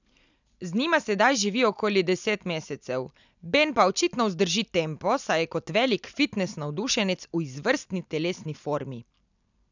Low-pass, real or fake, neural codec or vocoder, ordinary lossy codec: 7.2 kHz; real; none; none